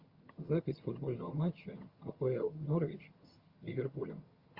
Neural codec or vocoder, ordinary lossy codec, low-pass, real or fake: vocoder, 22.05 kHz, 80 mel bands, HiFi-GAN; Opus, 32 kbps; 5.4 kHz; fake